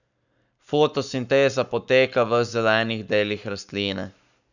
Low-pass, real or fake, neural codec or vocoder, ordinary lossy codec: 7.2 kHz; fake; codec, 44.1 kHz, 7.8 kbps, Pupu-Codec; none